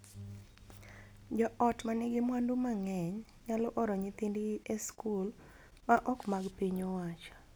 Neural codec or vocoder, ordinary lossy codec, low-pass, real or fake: none; none; none; real